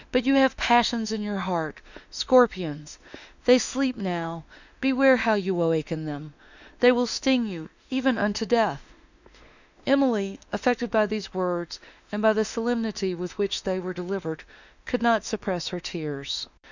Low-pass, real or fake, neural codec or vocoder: 7.2 kHz; fake; autoencoder, 48 kHz, 32 numbers a frame, DAC-VAE, trained on Japanese speech